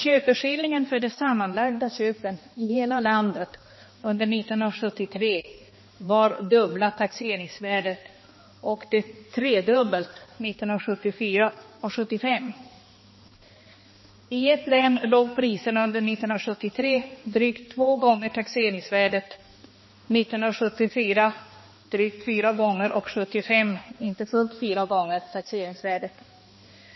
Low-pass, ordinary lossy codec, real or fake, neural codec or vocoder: 7.2 kHz; MP3, 24 kbps; fake; codec, 16 kHz, 2 kbps, X-Codec, HuBERT features, trained on balanced general audio